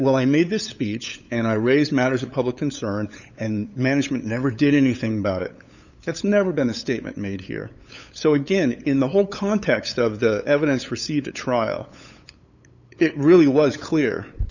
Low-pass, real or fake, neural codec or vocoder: 7.2 kHz; fake; codec, 16 kHz, 8 kbps, FunCodec, trained on LibriTTS, 25 frames a second